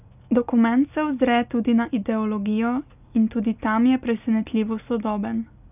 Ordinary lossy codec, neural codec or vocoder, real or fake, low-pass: none; none; real; 3.6 kHz